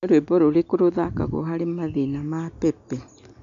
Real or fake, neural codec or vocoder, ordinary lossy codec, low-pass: real; none; none; 7.2 kHz